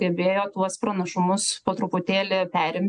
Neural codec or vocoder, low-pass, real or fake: none; 9.9 kHz; real